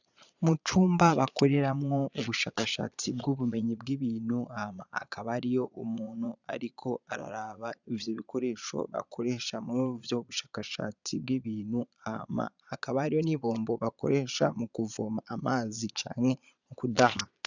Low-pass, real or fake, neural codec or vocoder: 7.2 kHz; fake; vocoder, 22.05 kHz, 80 mel bands, Vocos